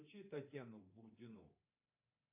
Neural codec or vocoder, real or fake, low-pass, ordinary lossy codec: codec, 24 kHz, 3.1 kbps, DualCodec; fake; 3.6 kHz; AAC, 24 kbps